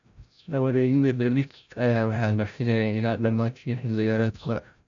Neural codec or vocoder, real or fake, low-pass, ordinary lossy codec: codec, 16 kHz, 0.5 kbps, FreqCodec, larger model; fake; 7.2 kHz; MP3, 64 kbps